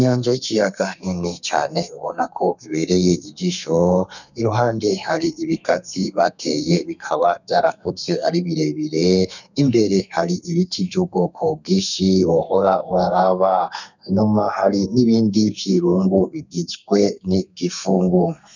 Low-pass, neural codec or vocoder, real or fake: 7.2 kHz; codec, 32 kHz, 1.9 kbps, SNAC; fake